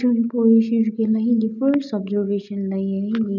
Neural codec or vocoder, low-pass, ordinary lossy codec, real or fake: none; 7.2 kHz; none; real